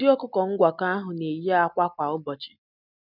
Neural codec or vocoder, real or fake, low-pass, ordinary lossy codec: none; real; 5.4 kHz; none